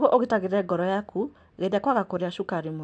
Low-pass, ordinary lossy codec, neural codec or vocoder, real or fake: 9.9 kHz; AAC, 64 kbps; none; real